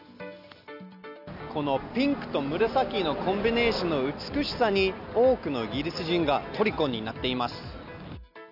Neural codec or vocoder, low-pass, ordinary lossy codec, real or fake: none; 5.4 kHz; none; real